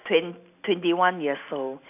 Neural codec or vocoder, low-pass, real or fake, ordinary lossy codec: none; 3.6 kHz; real; AAC, 32 kbps